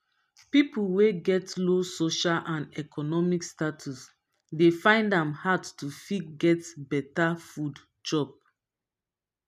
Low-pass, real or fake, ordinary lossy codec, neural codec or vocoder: 14.4 kHz; real; none; none